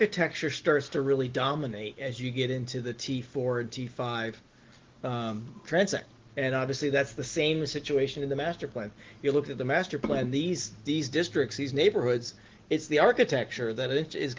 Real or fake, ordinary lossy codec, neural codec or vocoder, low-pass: real; Opus, 16 kbps; none; 7.2 kHz